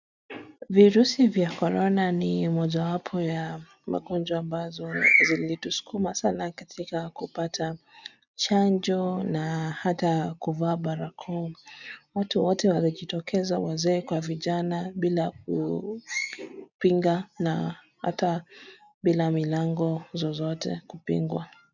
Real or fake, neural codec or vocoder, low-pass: real; none; 7.2 kHz